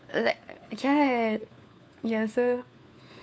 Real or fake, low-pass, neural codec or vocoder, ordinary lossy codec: fake; none; codec, 16 kHz, 4 kbps, FunCodec, trained on LibriTTS, 50 frames a second; none